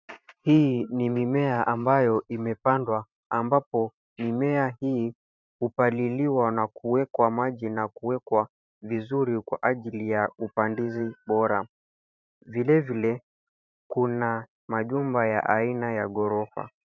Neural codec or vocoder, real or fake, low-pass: vocoder, 44.1 kHz, 128 mel bands every 512 samples, BigVGAN v2; fake; 7.2 kHz